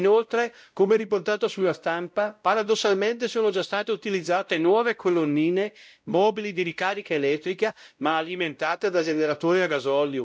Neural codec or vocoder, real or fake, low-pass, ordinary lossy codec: codec, 16 kHz, 0.5 kbps, X-Codec, WavLM features, trained on Multilingual LibriSpeech; fake; none; none